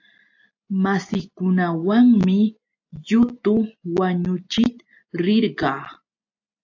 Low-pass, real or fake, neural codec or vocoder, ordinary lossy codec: 7.2 kHz; real; none; AAC, 48 kbps